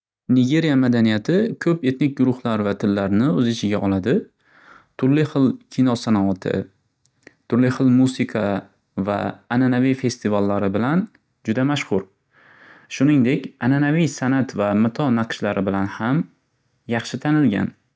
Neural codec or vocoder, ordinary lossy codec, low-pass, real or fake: none; none; none; real